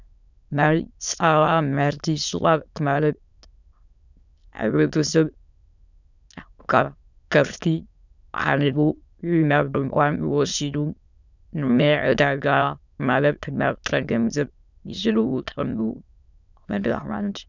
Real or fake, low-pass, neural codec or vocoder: fake; 7.2 kHz; autoencoder, 22.05 kHz, a latent of 192 numbers a frame, VITS, trained on many speakers